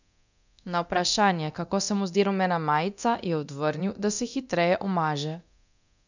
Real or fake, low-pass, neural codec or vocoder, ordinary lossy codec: fake; 7.2 kHz; codec, 24 kHz, 0.9 kbps, DualCodec; none